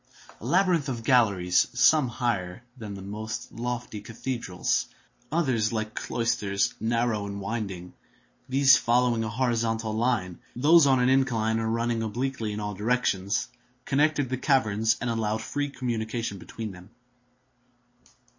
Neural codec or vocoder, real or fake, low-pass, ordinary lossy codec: none; real; 7.2 kHz; MP3, 32 kbps